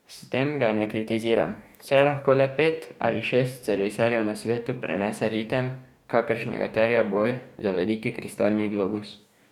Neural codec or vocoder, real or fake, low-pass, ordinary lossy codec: codec, 44.1 kHz, 2.6 kbps, DAC; fake; 19.8 kHz; none